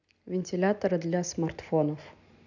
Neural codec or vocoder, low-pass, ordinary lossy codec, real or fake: none; 7.2 kHz; none; real